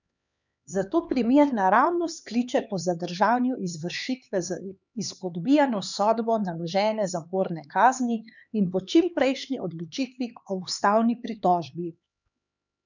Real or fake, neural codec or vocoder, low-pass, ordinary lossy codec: fake; codec, 16 kHz, 4 kbps, X-Codec, HuBERT features, trained on LibriSpeech; 7.2 kHz; none